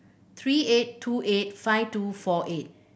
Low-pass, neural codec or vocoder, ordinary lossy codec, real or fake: none; none; none; real